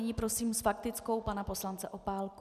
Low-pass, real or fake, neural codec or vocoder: 14.4 kHz; real; none